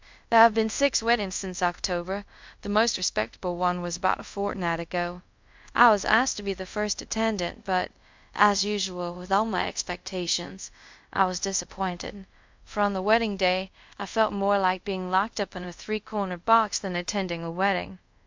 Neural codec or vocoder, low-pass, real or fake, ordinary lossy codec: codec, 24 kHz, 0.5 kbps, DualCodec; 7.2 kHz; fake; MP3, 64 kbps